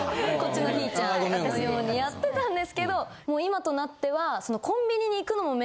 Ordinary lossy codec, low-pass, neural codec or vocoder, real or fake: none; none; none; real